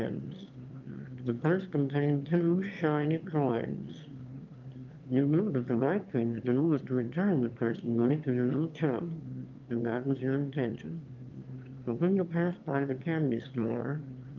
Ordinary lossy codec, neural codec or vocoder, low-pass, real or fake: Opus, 24 kbps; autoencoder, 22.05 kHz, a latent of 192 numbers a frame, VITS, trained on one speaker; 7.2 kHz; fake